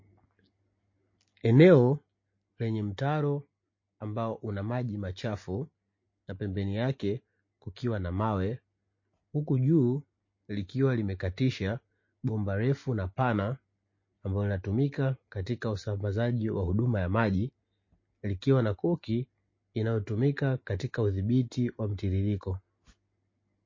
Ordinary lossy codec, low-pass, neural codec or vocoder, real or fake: MP3, 32 kbps; 7.2 kHz; none; real